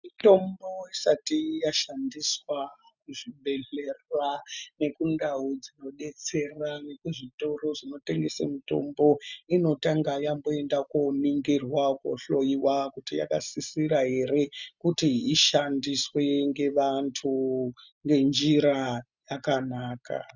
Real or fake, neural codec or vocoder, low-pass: real; none; 7.2 kHz